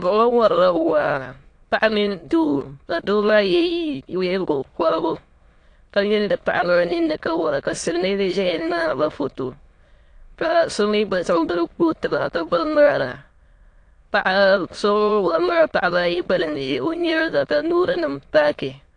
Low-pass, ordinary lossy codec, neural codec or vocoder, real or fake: 9.9 kHz; AAC, 48 kbps; autoencoder, 22.05 kHz, a latent of 192 numbers a frame, VITS, trained on many speakers; fake